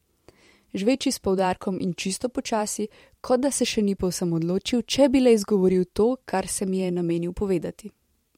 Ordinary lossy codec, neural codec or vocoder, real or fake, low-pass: MP3, 64 kbps; vocoder, 44.1 kHz, 128 mel bands every 512 samples, BigVGAN v2; fake; 19.8 kHz